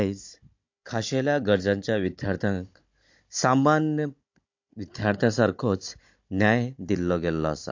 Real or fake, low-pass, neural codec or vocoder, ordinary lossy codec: fake; 7.2 kHz; codec, 16 kHz, 16 kbps, FunCodec, trained on Chinese and English, 50 frames a second; MP3, 48 kbps